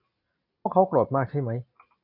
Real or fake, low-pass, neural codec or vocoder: real; 5.4 kHz; none